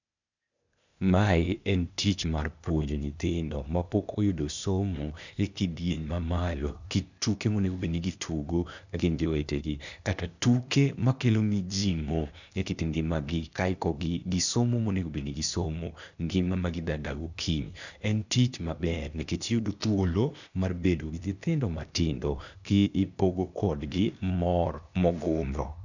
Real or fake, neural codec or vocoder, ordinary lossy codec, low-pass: fake; codec, 16 kHz, 0.8 kbps, ZipCodec; none; 7.2 kHz